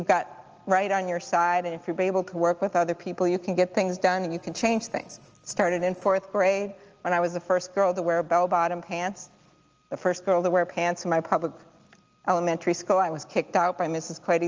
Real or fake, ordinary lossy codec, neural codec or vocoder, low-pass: real; Opus, 24 kbps; none; 7.2 kHz